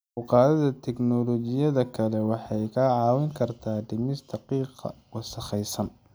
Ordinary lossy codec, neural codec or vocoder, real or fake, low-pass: none; none; real; none